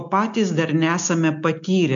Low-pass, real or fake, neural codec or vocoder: 7.2 kHz; real; none